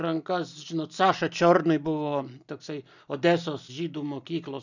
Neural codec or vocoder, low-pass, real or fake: none; 7.2 kHz; real